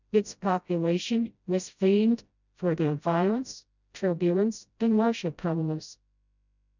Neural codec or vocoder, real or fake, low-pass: codec, 16 kHz, 0.5 kbps, FreqCodec, smaller model; fake; 7.2 kHz